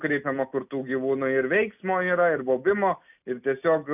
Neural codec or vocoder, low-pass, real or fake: none; 3.6 kHz; real